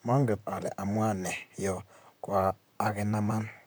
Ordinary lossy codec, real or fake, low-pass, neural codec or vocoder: none; fake; none; vocoder, 44.1 kHz, 128 mel bands, Pupu-Vocoder